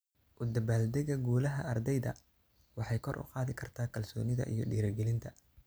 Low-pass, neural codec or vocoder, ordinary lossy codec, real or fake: none; none; none; real